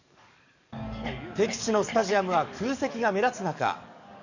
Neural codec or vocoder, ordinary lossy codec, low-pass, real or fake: codec, 44.1 kHz, 7.8 kbps, DAC; none; 7.2 kHz; fake